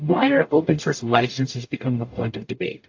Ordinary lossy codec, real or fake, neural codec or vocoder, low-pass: AAC, 48 kbps; fake; codec, 44.1 kHz, 0.9 kbps, DAC; 7.2 kHz